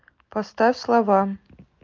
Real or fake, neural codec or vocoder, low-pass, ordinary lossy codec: real; none; 7.2 kHz; Opus, 24 kbps